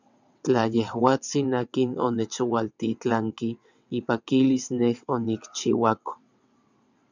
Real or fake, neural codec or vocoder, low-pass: fake; vocoder, 22.05 kHz, 80 mel bands, WaveNeXt; 7.2 kHz